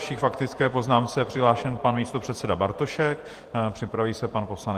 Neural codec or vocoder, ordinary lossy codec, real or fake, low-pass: vocoder, 48 kHz, 128 mel bands, Vocos; Opus, 24 kbps; fake; 14.4 kHz